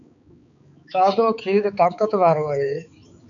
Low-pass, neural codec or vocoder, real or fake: 7.2 kHz; codec, 16 kHz, 4 kbps, X-Codec, HuBERT features, trained on balanced general audio; fake